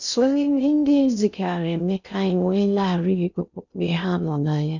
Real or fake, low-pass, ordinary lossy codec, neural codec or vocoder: fake; 7.2 kHz; none; codec, 16 kHz in and 24 kHz out, 0.6 kbps, FocalCodec, streaming, 2048 codes